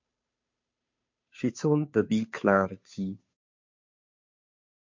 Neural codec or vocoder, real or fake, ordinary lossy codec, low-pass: codec, 16 kHz, 2 kbps, FunCodec, trained on Chinese and English, 25 frames a second; fake; MP3, 48 kbps; 7.2 kHz